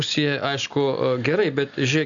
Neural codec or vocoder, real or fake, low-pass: none; real; 7.2 kHz